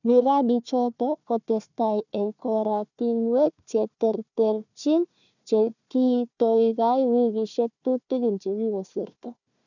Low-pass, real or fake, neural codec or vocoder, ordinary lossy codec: 7.2 kHz; fake; codec, 16 kHz, 1 kbps, FunCodec, trained on Chinese and English, 50 frames a second; none